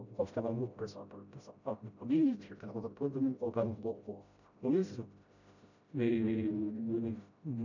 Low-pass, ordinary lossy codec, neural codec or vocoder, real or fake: 7.2 kHz; none; codec, 16 kHz, 0.5 kbps, FreqCodec, smaller model; fake